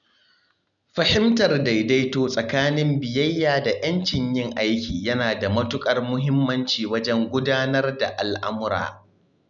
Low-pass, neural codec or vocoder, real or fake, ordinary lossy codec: 7.2 kHz; none; real; none